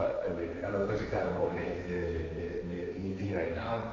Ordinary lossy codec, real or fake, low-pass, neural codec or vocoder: none; fake; none; codec, 16 kHz, 1.1 kbps, Voila-Tokenizer